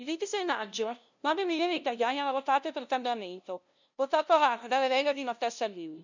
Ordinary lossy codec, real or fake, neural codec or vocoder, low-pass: none; fake; codec, 16 kHz, 0.5 kbps, FunCodec, trained on LibriTTS, 25 frames a second; 7.2 kHz